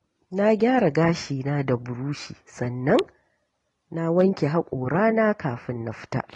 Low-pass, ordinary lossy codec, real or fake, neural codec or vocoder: 10.8 kHz; AAC, 32 kbps; real; none